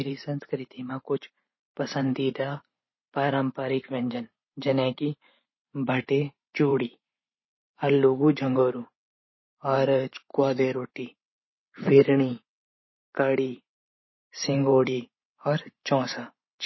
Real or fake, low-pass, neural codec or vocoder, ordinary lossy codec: fake; 7.2 kHz; vocoder, 44.1 kHz, 128 mel bands every 512 samples, BigVGAN v2; MP3, 24 kbps